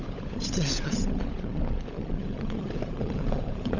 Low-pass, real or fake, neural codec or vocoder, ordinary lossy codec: 7.2 kHz; fake; codec, 16 kHz, 16 kbps, FunCodec, trained on LibriTTS, 50 frames a second; none